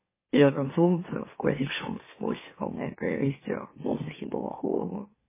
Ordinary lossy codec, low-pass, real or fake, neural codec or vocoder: MP3, 16 kbps; 3.6 kHz; fake; autoencoder, 44.1 kHz, a latent of 192 numbers a frame, MeloTTS